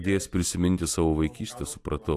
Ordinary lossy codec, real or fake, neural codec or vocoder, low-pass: AAC, 64 kbps; real; none; 14.4 kHz